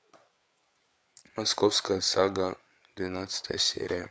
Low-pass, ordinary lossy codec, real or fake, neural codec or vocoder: none; none; fake; codec, 16 kHz, 8 kbps, FreqCodec, larger model